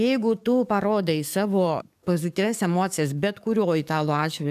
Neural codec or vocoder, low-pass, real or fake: codec, 44.1 kHz, 7.8 kbps, DAC; 14.4 kHz; fake